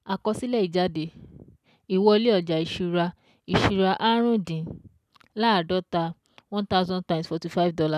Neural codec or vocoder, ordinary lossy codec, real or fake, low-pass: none; none; real; 14.4 kHz